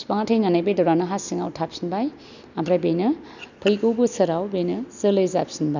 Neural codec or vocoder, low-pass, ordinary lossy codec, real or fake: none; 7.2 kHz; none; real